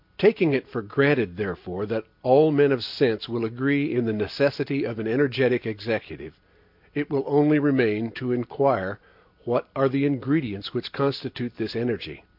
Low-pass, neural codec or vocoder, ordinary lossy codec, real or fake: 5.4 kHz; none; MP3, 48 kbps; real